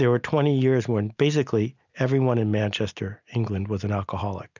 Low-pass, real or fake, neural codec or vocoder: 7.2 kHz; real; none